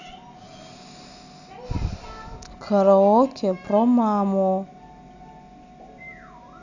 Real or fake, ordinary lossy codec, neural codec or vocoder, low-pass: real; none; none; 7.2 kHz